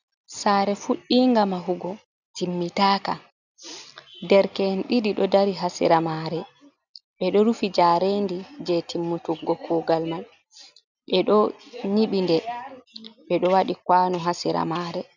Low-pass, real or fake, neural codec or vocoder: 7.2 kHz; real; none